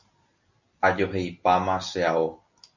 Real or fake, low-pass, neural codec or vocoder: real; 7.2 kHz; none